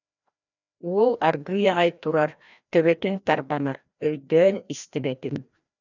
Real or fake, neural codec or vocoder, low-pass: fake; codec, 16 kHz, 1 kbps, FreqCodec, larger model; 7.2 kHz